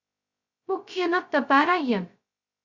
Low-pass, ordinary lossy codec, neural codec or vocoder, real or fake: 7.2 kHz; Opus, 64 kbps; codec, 16 kHz, 0.2 kbps, FocalCodec; fake